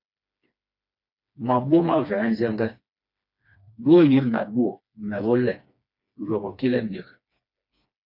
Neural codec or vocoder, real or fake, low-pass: codec, 16 kHz, 2 kbps, FreqCodec, smaller model; fake; 5.4 kHz